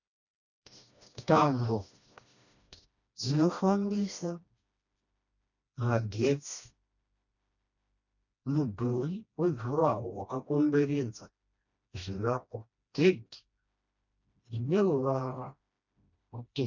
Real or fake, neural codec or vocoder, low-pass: fake; codec, 16 kHz, 1 kbps, FreqCodec, smaller model; 7.2 kHz